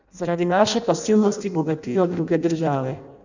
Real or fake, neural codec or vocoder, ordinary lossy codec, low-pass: fake; codec, 16 kHz in and 24 kHz out, 0.6 kbps, FireRedTTS-2 codec; none; 7.2 kHz